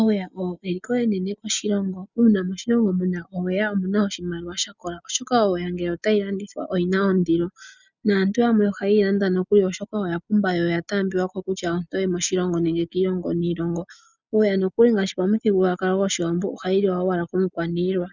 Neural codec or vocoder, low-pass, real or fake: none; 7.2 kHz; real